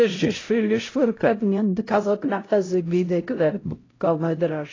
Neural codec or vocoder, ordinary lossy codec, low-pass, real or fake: codec, 16 kHz, 0.5 kbps, X-Codec, HuBERT features, trained on LibriSpeech; AAC, 32 kbps; 7.2 kHz; fake